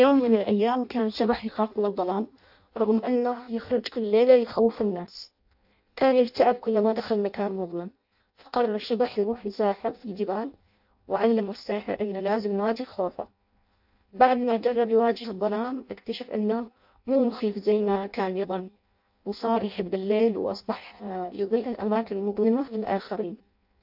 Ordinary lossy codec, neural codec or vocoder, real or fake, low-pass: AAC, 48 kbps; codec, 16 kHz in and 24 kHz out, 0.6 kbps, FireRedTTS-2 codec; fake; 5.4 kHz